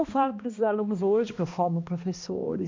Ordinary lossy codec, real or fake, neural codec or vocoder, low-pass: MP3, 48 kbps; fake; codec, 16 kHz, 1 kbps, X-Codec, HuBERT features, trained on balanced general audio; 7.2 kHz